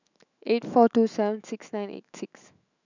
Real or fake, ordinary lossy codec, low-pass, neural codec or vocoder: fake; none; 7.2 kHz; autoencoder, 48 kHz, 128 numbers a frame, DAC-VAE, trained on Japanese speech